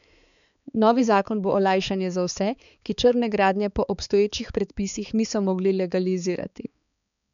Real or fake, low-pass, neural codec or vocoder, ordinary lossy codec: fake; 7.2 kHz; codec, 16 kHz, 4 kbps, X-Codec, HuBERT features, trained on balanced general audio; none